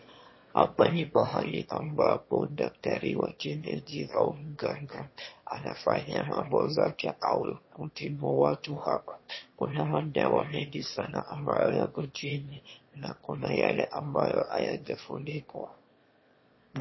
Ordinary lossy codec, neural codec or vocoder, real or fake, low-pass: MP3, 24 kbps; autoencoder, 22.05 kHz, a latent of 192 numbers a frame, VITS, trained on one speaker; fake; 7.2 kHz